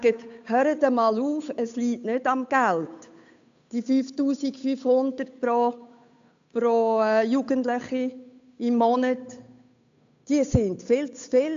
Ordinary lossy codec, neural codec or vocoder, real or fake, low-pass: AAC, 64 kbps; codec, 16 kHz, 8 kbps, FunCodec, trained on Chinese and English, 25 frames a second; fake; 7.2 kHz